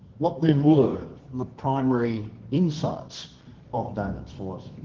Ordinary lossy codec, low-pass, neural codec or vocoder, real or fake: Opus, 16 kbps; 7.2 kHz; codec, 24 kHz, 0.9 kbps, WavTokenizer, medium music audio release; fake